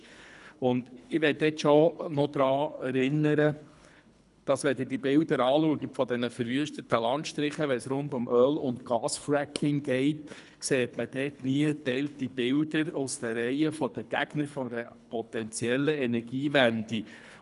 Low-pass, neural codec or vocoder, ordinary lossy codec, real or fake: 10.8 kHz; codec, 24 kHz, 3 kbps, HILCodec; none; fake